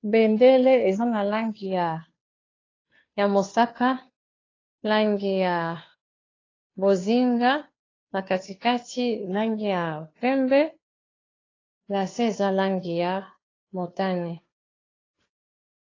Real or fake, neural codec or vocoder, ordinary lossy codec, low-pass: fake; codec, 16 kHz, 2 kbps, FunCodec, trained on Chinese and English, 25 frames a second; AAC, 32 kbps; 7.2 kHz